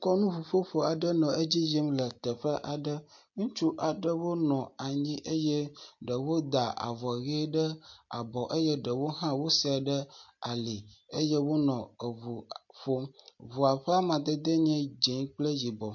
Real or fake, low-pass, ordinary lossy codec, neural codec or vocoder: real; 7.2 kHz; MP3, 48 kbps; none